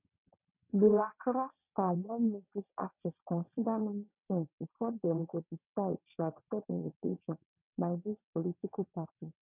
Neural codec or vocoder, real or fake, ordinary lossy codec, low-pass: vocoder, 22.05 kHz, 80 mel bands, WaveNeXt; fake; none; 3.6 kHz